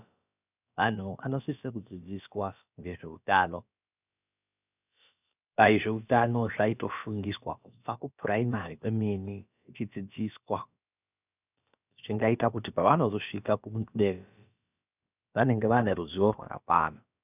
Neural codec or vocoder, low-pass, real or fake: codec, 16 kHz, about 1 kbps, DyCAST, with the encoder's durations; 3.6 kHz; fake